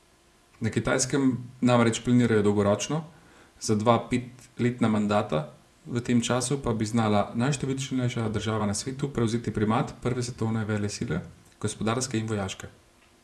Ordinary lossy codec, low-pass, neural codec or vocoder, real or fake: none; none; vocoder, 24 kHz, 100 mel bands, Vocos; fake